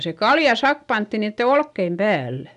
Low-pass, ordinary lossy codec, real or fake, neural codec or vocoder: 10.8 kHz; none; real; none